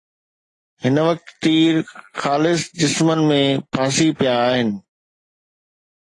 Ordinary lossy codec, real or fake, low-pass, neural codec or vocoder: AAC, 32 kbps; real; 10.8 kHz; none